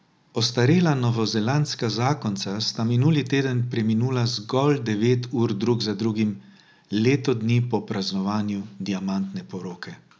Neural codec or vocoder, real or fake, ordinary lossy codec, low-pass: none; real; none; none